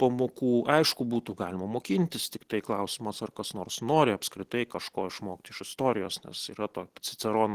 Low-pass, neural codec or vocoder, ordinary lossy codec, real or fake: 14.4 kHz; none; Opus, 16 kbps; real